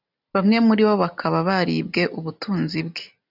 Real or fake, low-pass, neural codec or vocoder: real; 5.4 kHz; none